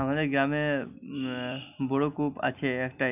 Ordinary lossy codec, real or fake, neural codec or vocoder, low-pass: none; real; none; 3.6 kHz